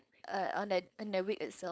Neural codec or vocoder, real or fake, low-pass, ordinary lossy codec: codec, 16 kHz, 4.8 kbps, FACodec; fake; none; none